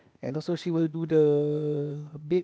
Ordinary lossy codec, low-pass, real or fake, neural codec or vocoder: none; none; fake; codec, 16 kHz, 0.8 kbps, ZipCodec